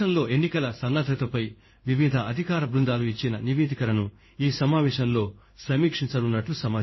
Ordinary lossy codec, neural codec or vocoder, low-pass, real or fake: MP3, 24 kbps; codec, 16 kHz in and 24 kHz out, 1 kbps, XY-Tokenizer; 7.2 kHz; fake